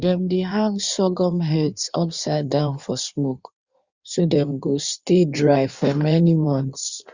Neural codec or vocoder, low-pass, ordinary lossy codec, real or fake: codec, 16 kHz in and 24 kHz out, 1.1 kbps, FireRedTTS-2 codec; 7.2 kHz; Opus, 64 kbps; fake